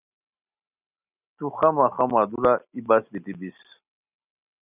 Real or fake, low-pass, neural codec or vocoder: real; 3.6 kHz; none